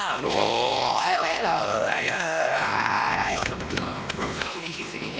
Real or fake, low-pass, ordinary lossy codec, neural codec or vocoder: fake; none; none; codec, 16 kHz, 1 kbps, X-Codec, WavLM features, trained on Multilingual LibriSpeech